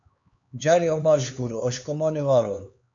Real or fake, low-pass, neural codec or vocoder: fake; 7.2 kHz; codec, 16 kHz, 4 kbps, X-Codec, HuBERT features, trained on LibriSpeech